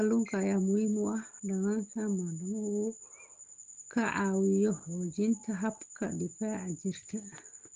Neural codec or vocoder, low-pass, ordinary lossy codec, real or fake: none; 7.2 kHz; Opus, 16 kbps; real